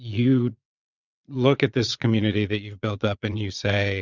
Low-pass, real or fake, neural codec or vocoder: 7.2 kHz; fake; vocoder, 22.05 kHz, 80 mel bands, Vocos